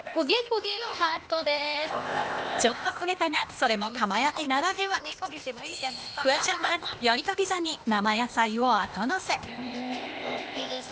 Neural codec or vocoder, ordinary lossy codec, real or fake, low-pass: codec, 16 kHz, 0.8 kbps, ZipCodec; none; fake; none